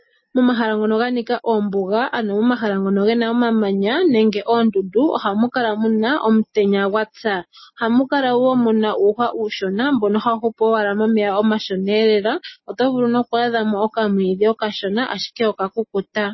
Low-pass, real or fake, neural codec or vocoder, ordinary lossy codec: 7.2 kHz; real; none; MP3, 24 kbps